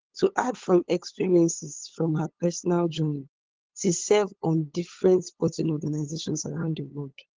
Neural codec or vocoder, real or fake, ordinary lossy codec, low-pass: codec, 16 kHz, 8 kbps, FunCodec, trained on LibriTTS, 25 frames a second; fake; Opus, 16 kbps; 7.2 kHz